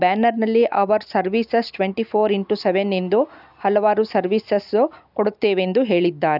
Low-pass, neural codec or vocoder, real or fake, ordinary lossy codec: 5.4 kHz; none; real; none